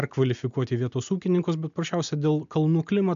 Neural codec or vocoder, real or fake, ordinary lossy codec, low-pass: none; real; MP3, 64 kbps; 7.2 kHz